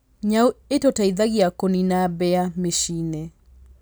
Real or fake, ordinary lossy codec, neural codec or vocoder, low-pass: real; none; none; none